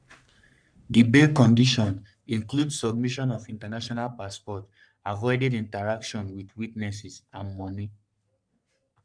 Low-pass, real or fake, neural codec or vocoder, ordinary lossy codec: 9.9 kHz; fake; codec, 44.1 kHz, 3.4 kbps, Pupu-Codec; none